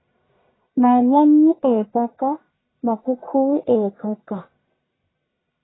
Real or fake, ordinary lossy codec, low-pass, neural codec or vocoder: fake; AAC, 16 kbps; 7.2 kHz; codec, 44.1 kHz, 1.7 kbps, Pupu-Codec